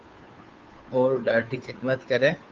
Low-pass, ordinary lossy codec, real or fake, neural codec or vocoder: 7.2 kHz; Opus, 32 kbps; fake; codec, 16 kHz, 2 kbps, FunCodec, trained on Chinese and English, 25 frames a second